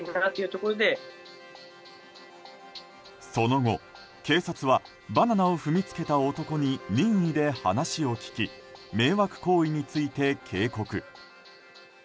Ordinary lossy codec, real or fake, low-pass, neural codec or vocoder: none; real; none; none